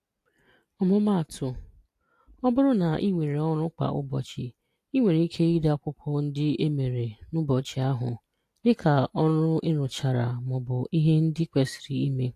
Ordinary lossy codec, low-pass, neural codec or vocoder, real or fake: AAC, 64 kbps; 14.4 kHz; none; real